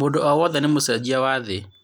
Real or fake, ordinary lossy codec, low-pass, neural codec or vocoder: real; none; none; none